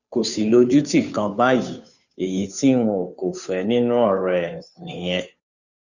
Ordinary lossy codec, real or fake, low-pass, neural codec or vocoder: none; fake; 7.2 kHz; codec, 16 kHz, 2 kbps, FunCodec, trained on Chinese and English, 25 frames a second